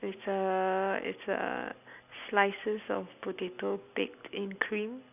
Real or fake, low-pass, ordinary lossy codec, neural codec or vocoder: real; 3.6 kHz; none; none